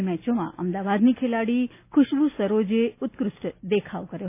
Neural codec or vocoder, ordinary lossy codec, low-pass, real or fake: none; none; 3.6 kHz; real